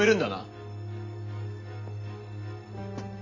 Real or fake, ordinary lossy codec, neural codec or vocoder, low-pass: real; none; none; 7.2 kHz